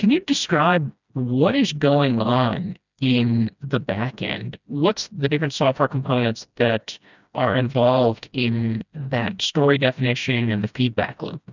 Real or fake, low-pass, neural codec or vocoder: fake; 7.2 kHz; codec, 16 kHz, 1 kbps, FreqCodec, smaller model